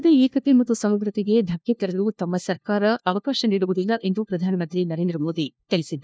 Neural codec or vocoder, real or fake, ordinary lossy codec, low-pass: codec, 16 kHz, 1 kbps, FunCodec, trained on LibriTTS, 50 frames a second; fake; none; none